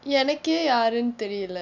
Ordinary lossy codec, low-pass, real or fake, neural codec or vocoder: none; 7.2 kHz; real; none